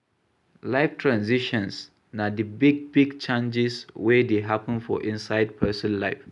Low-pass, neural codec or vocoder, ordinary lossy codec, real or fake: 10.8 kHz; none; none; real